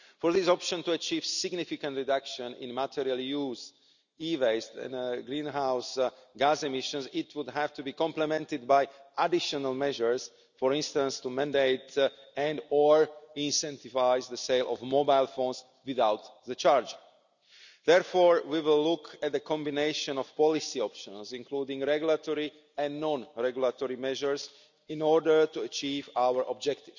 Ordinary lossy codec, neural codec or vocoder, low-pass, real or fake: none; none; 7.2 kHz; real